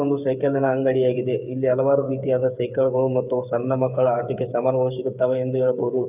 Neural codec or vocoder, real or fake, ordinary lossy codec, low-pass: codec, 16 kHz, 8 kbps, FreqCodec, smaller model; fake; none; 3.6 kHz